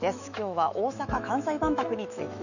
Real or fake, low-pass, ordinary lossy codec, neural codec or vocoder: fake; 7.2 kHz; none; autoencoder, 48 kHz, 128 numbers a frame, DAC-VAE, trained on Japanese speech